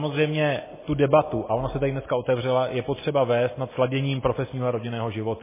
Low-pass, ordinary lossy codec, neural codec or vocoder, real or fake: 3.6 kHz; MP3, 16 kbps; none; real